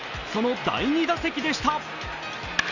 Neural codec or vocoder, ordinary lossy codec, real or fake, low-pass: none; none; real; 7.2 kHz